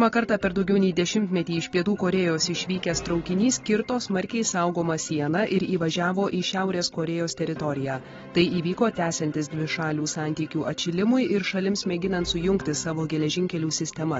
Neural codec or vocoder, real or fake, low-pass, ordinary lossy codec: none; real; 7.2 kHz; AAC, 24 kbps